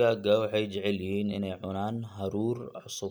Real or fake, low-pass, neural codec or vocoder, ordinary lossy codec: real; none; none; none